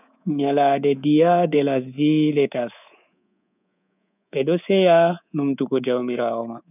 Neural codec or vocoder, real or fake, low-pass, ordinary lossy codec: codec, 24 kHz, 3.1 kbps, DualCodec; fake; 3.6 kHz; none